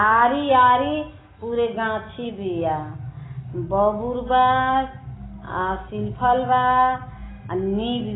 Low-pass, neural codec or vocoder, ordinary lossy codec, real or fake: 7.2 kHz; none; AAC, 16 kbps; real